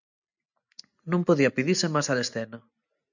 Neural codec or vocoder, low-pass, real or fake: none; 7.2 kHz; real